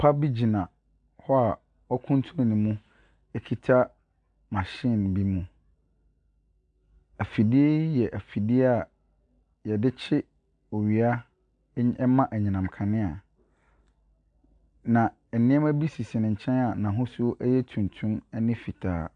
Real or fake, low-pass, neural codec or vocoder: real; 9.9 kHz; none